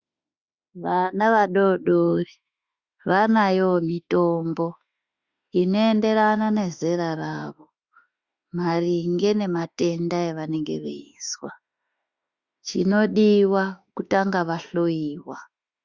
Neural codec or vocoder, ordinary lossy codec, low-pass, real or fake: autoencoder, 48 kHz, 32 numbers a frame, DAC-VAE, trained on Japanese speech; Opus, 64 kbps; 7.2 kHz; fake